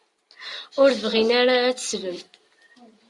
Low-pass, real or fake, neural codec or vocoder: 10.8 kHz; real; none